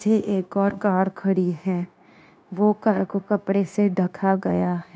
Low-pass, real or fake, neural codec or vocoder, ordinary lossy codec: none; fake; codec, 16 kHz, 0.9 kbps, LongCat-Audio-Codec; none